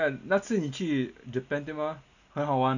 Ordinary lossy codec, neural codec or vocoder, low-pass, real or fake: none; none; 7.2 kHz; real